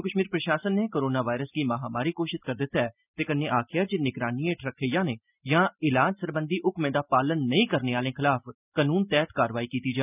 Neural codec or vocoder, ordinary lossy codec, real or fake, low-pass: none; none; real; 3.6 kHz